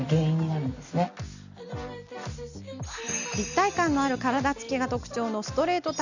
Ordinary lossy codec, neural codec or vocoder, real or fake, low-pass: none; none; real; 7.2 kHz